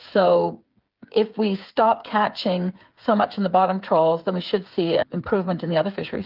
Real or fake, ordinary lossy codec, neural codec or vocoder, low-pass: fake; Opus, 32 kbps; codec, 16 kHz, 8 kbps, FreqCodec, smaller model; 5.4 kHz